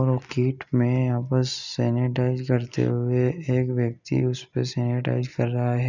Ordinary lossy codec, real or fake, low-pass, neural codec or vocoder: none; real; 7.2 kHz; none